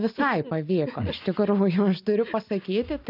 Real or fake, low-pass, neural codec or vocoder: real; 5.4 kHz; none